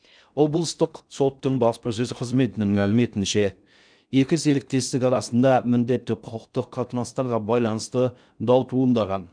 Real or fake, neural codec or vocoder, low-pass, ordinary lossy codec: fake; codec, 16 kHz in and 24 kHz out, 0.6 kbps, FocalCodec, streaming, 4096 codes; 9.9 kHz; none